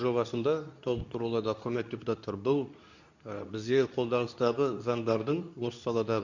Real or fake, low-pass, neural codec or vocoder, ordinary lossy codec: fake; 7.2 kHz; codec, 24 kHz, 0.9 kbps, WavTokenizer, medium speech release version 2; none